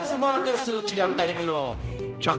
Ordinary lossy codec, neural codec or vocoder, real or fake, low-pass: none; codec, 16 kHz, 0.5 kbps, X-Codec, HuBERT features, trained on general audio; fake; none